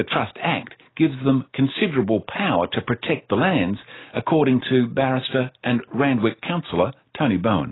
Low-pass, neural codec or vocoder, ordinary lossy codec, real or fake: 7.2 kHz; none; AAC, 16 kbps; real